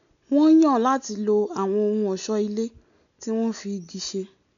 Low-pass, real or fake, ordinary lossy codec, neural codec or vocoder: 7.2 kHz; real; none; none